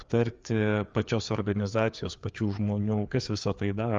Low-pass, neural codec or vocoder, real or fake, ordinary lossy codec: 7.2 kHz; codec, 16 kHz, 4 kbps, FreqCodec, larger model; fake; Opus, 32 kbps